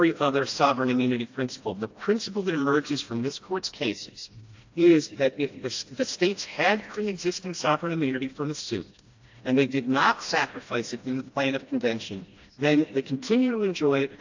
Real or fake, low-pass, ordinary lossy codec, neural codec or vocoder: fake; 7.2 kHz; AAC, 48 kbps; codec, 16 kHz, 1 kbps, FreqCodec, smaller model